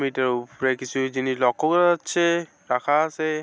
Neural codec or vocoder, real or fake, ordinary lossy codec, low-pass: none; real; none; none